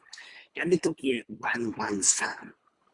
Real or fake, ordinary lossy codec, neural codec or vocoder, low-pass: fake; Opus, 64 kbps; codec, 24 kHz, 3 kbps, HILCodec; 10.8 kHz